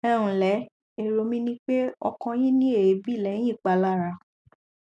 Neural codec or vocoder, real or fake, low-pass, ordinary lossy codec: none; real; none; none